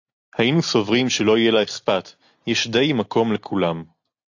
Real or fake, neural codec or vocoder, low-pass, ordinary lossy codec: real; none; 7.2 kHz; AAC, 48 kbps